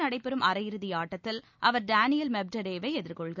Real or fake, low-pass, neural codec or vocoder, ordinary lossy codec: real; 7.2 kHz; none; none